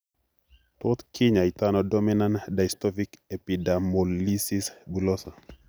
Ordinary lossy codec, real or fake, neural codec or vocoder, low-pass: none; real; none; none